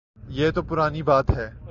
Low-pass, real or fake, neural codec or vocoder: 7.2 kHz; real; none